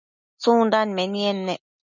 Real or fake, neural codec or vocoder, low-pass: real; none; 7.2 kHz